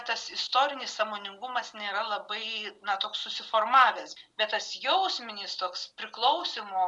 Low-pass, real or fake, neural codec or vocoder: 10.8 kHz; real; none